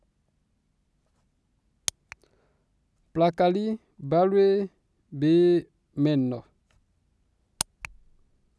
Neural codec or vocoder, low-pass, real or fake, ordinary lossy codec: none; none; real; none